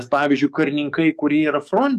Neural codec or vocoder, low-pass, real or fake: codec, 44.1 kHz, 7.8 kbps, DAC; 14.4 kHz; fake